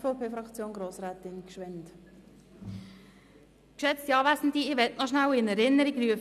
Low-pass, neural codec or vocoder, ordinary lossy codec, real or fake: 14.4 kHz; none; none; real